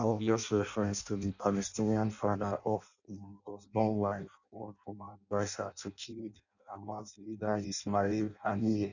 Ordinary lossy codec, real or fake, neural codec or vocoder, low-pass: none; fake; codec, 16 kHz in and 24 kHz out, 0.6 kbps, FireRedTTS-2 codec; 7.2 kHz